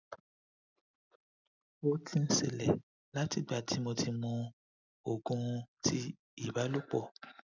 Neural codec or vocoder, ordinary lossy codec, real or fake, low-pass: none; none; real; 7.2 kHz